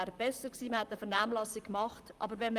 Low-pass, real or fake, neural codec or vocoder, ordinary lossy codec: 14.4 kHz; fake; vocoder, 44.1 kHz, 128 mel bands, Pupu-Vocoder; Opus, 32 kbps